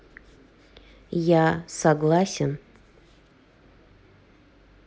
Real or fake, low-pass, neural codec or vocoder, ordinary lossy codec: real; none; none; none